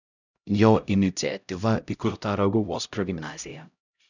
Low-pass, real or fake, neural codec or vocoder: 7.2 kHz; fake; codec, 16 kHz, 0.5 kbps, X-Codec, HuBERT features, trained on LibriSpeech